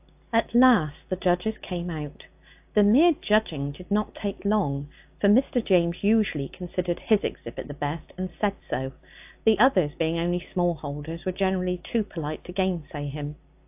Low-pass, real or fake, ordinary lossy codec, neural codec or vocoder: 3.6 kHz; real; AAC, 32 kbps; none